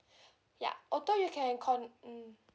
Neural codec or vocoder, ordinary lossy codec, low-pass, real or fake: none; none; none; real